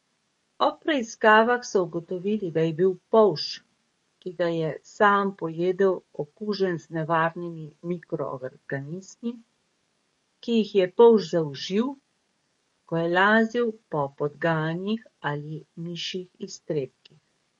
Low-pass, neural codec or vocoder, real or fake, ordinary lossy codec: 19.8 kHz; codec, 44.1 kHz, 7.8 kbps, DAC; fake; MP3, 48 kbps